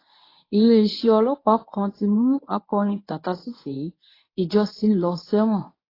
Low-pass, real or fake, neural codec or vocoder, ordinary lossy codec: 5.4 kHz; fake; codec, 24 kHz, 0.9 kbps, WavTokenizer, medium speech release version 1; AAC, 24 kbps